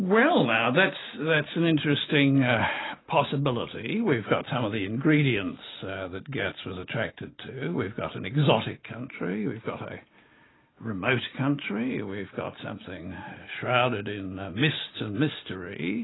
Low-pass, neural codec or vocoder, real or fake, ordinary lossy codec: 7.2 kHz; none; real; AAC, 16 kbps